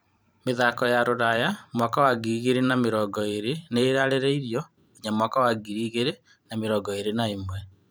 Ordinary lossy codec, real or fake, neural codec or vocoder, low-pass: none; fake; vocoder, 44.1 kHz, 128 mel bands every 512 samples, BigVGAN v2; none